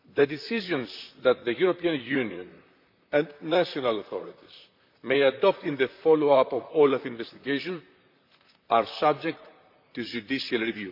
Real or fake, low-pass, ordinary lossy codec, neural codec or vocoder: fake; 5.4 kHz; MP3, 48 kbps; vocoder, 44.1 kHz, 128 mel bands, Pupu-Vocoder